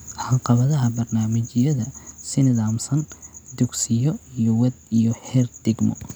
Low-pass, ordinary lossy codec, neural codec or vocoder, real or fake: none; none; none; real